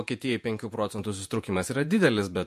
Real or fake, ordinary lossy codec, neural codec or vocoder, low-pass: fake; MP3, 64 kbps; vocoder, 48 kHz, 128 mel bands, Vocos; 14.4 kHz